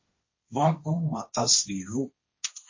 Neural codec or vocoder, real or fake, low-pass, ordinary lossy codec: codec, 16 kHz, 1.1 kbps, Voila-Tokenizer; fake; 7.2 kHz; MP3, 32 kbps